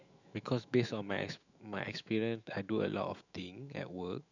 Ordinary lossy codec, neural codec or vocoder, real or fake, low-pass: none; autoencoder, 48 kHz, 128 numbers a frame, DAC-VAE, trained on Japanese speech; fake; 7.2 kHz